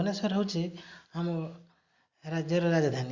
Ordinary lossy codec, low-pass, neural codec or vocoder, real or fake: Opus, 64 kbps; 7.2 kHz; none; real